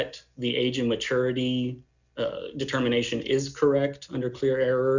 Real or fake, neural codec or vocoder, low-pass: real; none; 7.2 kHz